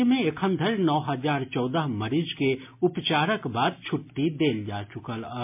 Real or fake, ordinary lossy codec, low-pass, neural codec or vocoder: real; MP3, 32 kbps; 3.6 kHz; none